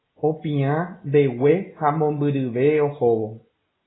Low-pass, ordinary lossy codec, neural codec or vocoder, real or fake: 7.2 kHz; AAC, 16 kbps; none; real